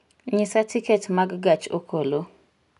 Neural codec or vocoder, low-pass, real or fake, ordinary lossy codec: none; 10.8 kHz; real; none